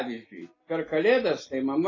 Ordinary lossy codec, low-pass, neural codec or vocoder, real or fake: AAC, 32 kbps; 7.2 kHz; none; real